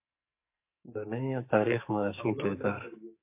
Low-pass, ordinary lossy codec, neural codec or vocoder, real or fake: 3.6 kHz; MP3, 24 kbps; codec, 44.1 kHz, 2.6 kbps, SNAC; fake